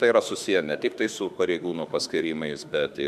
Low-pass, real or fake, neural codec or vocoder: 14.4 kHz; fake; autoencoder, 48 kHz, 32 numbers a frame, DAC-VAE, trained on Japanese speech